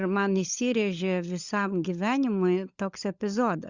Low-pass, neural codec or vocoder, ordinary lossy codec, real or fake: 7.2 kHz; codec, 16 kHz, 16 kbps, FunCodec, trained on LibriTTS, 50 frames a second; Opus, 64 kbps; fake